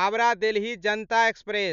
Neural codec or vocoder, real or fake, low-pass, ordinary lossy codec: none; real; 7.2 kHz; none